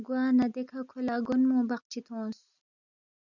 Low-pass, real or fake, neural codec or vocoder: 7.2 kHz; real; none